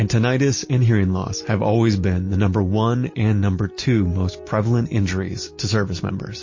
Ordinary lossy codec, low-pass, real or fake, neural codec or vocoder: MP3, 32 kbps; 7.2 kHz; real; none